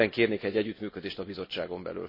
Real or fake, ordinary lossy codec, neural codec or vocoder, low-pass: real; MP3, 24 kbps; none; 5.4 kHz